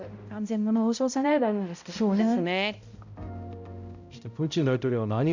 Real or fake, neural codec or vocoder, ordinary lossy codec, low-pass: fake; codec, 16 kHz, 0.5 kbps, X-Codec, HuBERT features, trained on balanced general audio; none; 7.2 kHz